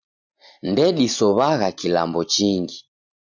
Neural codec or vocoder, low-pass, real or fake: none; 7.2 kHz; real